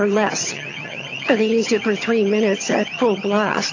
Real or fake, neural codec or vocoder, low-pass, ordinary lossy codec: fake; vocoder, 22.05 kHz, 80 mel bands, HiFi-GAN; 7.2 kHz; AAC, 32 kbps